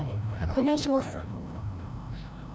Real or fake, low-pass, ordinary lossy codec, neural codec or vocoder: fake; none; none; codec, 16 kHz, 0.5 kbps, FreqCodec, larger model